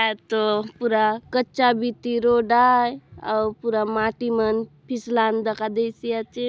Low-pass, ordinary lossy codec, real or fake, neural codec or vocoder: none; none; real; none